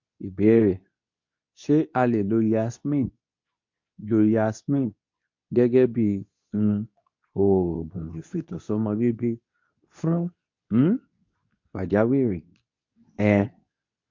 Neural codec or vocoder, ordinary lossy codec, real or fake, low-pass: codec, 24 kHz, 0.9 kbps, WavTokenizer, medium speech release version 2; MP3, 48 kbps; fake; 7.2 kHz